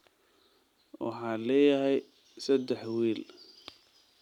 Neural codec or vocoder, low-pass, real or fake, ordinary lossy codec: none; 19.8 kHz; real; none